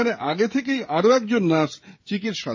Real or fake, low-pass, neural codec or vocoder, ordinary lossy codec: fake; 7.2 kHz; codec, 16 kHz, 16 kbps, FreqCodec, smaller model; MP3, 32 kbps